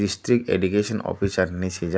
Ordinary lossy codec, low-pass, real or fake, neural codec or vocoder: none; none; real; none